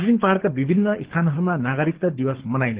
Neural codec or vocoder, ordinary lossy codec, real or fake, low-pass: codec, 24 kHz, 6 kbps, HILCodec; Opus, 16 kbps; fake; 3.6 kHz